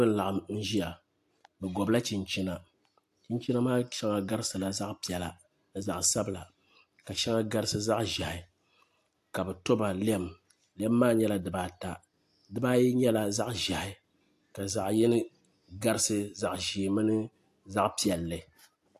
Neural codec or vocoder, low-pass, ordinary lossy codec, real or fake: none; 14.4 kHz; AAC, 64 kbps; real